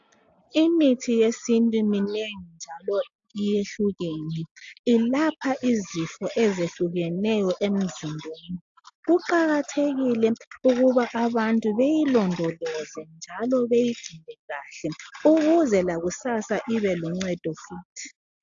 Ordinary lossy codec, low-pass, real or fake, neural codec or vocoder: AAC, 64 kbps; 7.2 kHz; real; none